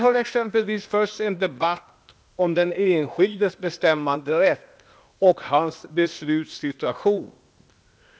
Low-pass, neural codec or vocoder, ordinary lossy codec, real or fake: none; codec, 16 kHz, 0.8 kbps, ZipCodec; none; fake